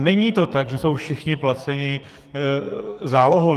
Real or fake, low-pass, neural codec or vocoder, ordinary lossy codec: fake; 14.4 kHz; codec, 44.1 kHz, 2.6 kbps, SNAC; Opus, 32 kbps